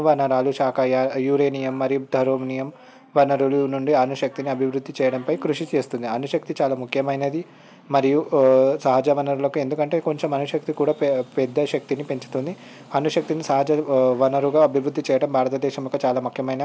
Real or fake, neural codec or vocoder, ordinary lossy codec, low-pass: real; none; none; none